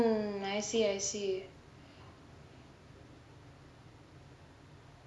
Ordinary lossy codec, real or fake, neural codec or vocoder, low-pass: none; real; none; none